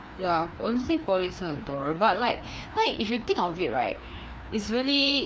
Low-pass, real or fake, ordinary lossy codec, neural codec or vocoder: none; fake; none; codec, 16 kHz, 2 kbps, FreqCodec, larger model